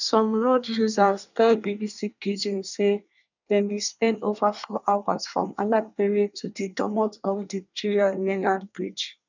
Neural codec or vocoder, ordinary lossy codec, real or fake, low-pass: codec, 24 kHz, 1 kbps, SNAC; none; fake; 7.2 kHz